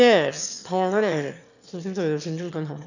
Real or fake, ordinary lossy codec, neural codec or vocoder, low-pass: fake; none; autoencoder, 22.05 kHz, a latent of 192 numbers a frame, VITS, trained on one speaker; 7.2 kHz